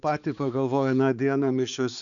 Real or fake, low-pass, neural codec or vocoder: fake; 7.2 kHz; codec, 16 kHz, 4 kbps, X-Codec, HuBERT features, trained on balanced general audio